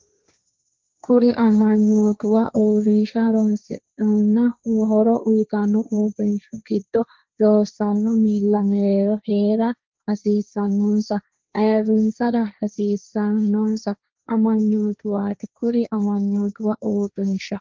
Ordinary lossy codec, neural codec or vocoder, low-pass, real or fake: Opus, 24 kbps; codec, 16 kHz, 1.1 kbps, Voila-Tokenizer; 7.2 kHz; fake